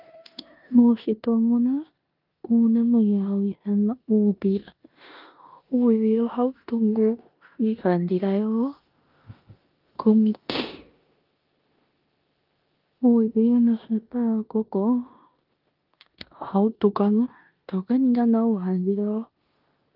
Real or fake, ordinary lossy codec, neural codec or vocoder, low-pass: fake; Opus, 24 kbps; codec, 16 kHz in and 24 kHz out, 0.9 kbps, LongCat-Audio-Codec, four codebook decoder; 5.4 kHz